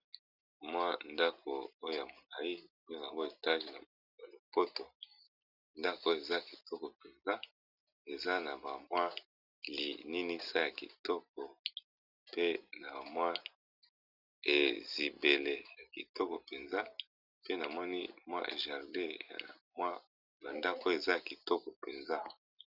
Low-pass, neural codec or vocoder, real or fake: 5.4 kHz; none; real